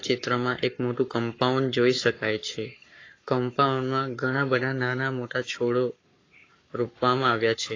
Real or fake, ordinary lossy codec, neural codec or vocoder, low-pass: fake; AAC, 32 kbps; codec, 44.1 kHz, 7.8 kbps, Pupu-Codec; 7.2 kHz